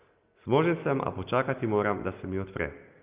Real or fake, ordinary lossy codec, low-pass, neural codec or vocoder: real; Opus, 24 kbps; 3.6 kHz; none